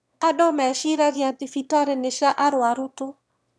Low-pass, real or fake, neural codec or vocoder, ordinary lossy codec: none; fake; autoencoder, 22.05 kHz, a latent of 192 numbers a frame, VITS, trained on one speaker; none